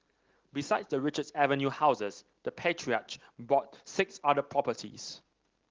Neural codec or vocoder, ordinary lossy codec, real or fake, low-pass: none; Opus, 16 kbps; real; 7.2 kHz